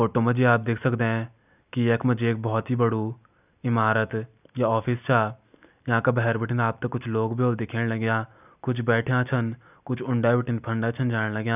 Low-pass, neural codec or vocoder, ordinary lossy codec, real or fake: 3.6 kHz; none; none; real